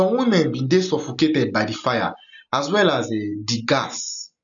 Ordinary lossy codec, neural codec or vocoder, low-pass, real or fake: none; none; 7.2 kHz; real